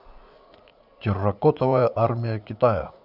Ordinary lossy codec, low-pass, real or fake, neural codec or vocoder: none; 5.4 kHz; fake; vocoder, 44.1 kHz, 80 mel bands, Vocos